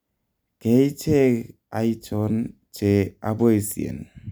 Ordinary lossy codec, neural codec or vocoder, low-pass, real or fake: none; none; none; real